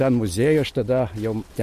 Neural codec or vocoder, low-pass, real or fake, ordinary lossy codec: none; 14.4 kHz; real; MP3, 64 kbps